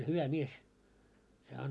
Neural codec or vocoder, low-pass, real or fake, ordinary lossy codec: none; none; real; none